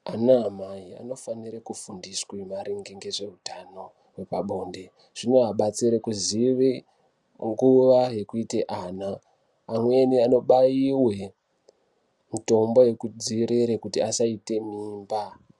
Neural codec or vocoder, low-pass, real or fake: none; 10.8 kHz; real